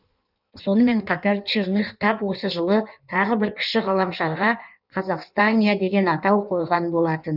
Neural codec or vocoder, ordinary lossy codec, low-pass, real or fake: codec, 16 kHz in and 24 kHz out, 1.1 kbps, FireRedTTS-2 codec; none; 5.4 kHz; fake